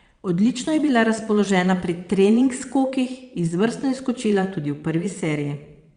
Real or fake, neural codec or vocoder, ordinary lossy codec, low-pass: fake; vocoder, 22.05 kHz, 80 mel bands, Vocos; Opus, 64 kbps; 9.9 kHz